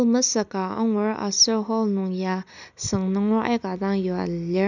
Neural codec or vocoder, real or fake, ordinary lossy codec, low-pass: none; real; none; 7.2 kHz